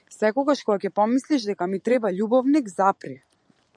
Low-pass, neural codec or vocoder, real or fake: 9.9 kHz; vocoder, 24 kHz, 100 mel bands, Vocos; fake